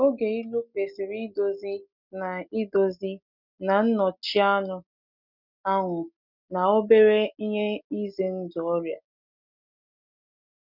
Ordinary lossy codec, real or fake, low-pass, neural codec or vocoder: none; real; 5.4 kHz; none